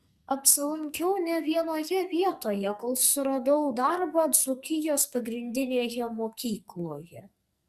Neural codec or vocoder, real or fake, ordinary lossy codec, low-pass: codec, 44.1 kHz, 2.6 kbps, SNAC; fake; Opus, 64 kbps; 14.4 kHz